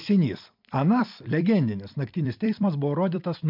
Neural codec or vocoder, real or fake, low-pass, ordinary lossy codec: vocoder, 44.1 kHz, 128 mel bands every 256 samples, BigVGAN v2; fake; 5.4 kHz; MP3, 48 kbps